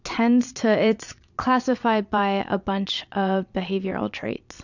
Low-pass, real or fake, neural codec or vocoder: 7.2 kHz; fake; vocoder, 22.05 kHz, 80 mel bands, Vocos